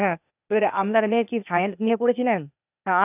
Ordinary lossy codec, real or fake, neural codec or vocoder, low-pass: none; fake; codec, 16 kHz, 0.8 kbps, ZipCodec; 3.6 kHz